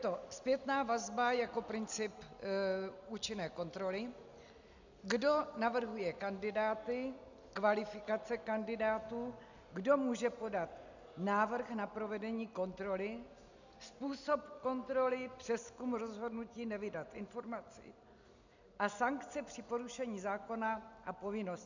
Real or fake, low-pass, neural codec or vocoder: real; 7.2 kHz; none